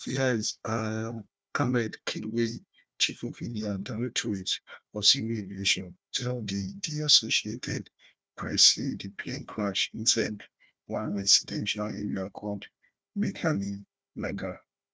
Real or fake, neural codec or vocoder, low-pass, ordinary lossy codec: fake; codec, 16 kHz, 1 kbps, FunCodec, trained on Chinese and English, 50 frames a second; none; none